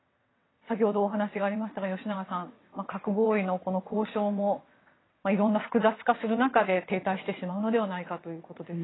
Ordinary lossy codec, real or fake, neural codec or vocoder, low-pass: AAC, 16 kbps; fake; vocoder, 22.05 kHz, 80 mel bands, WaveNeXt; 7.2 kHz